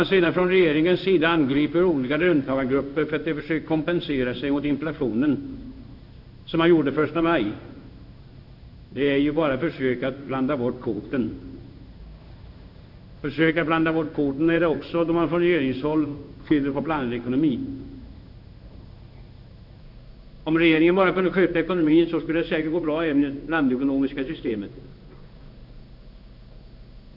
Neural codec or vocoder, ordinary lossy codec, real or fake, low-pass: codec, 16 kHz in and 24 kHz out, 1 kbps, XY-Tokenizer; none; fake; 5.4 kHz